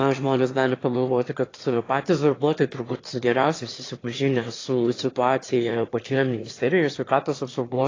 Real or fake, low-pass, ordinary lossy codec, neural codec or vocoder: fake; 7.2 kHz; AAC, 32 kbps; autoencoder, 22.05 kHz, a latent of 192 numbers a frame, VITS, trained on one speaker